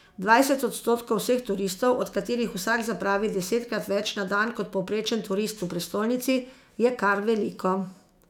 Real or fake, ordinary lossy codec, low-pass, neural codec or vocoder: fake; none; 19.8 kHz; autoencoder, 48 kHz, 128 numbers a frame, DAC-VAE, trained on Japanese speech